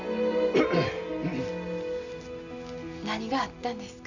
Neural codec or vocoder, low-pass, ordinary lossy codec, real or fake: none; 7.2 kHz; none; real